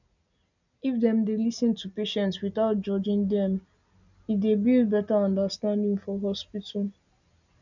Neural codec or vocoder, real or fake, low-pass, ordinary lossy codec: none; real; 7.2 kHz; none